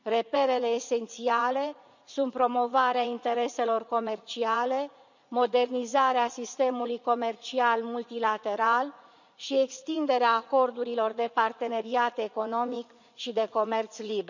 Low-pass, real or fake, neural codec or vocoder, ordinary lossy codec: 7.2 kHz; fake; vocoder, 44.1 kHz, 80 mel bands, Vocos; none